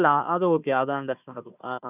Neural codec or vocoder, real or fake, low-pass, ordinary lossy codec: codec, 16 kHz, 1 kbps, FunCodec, trained on Chinese and English, 50 frames a second; fake; 3.6 kHz; none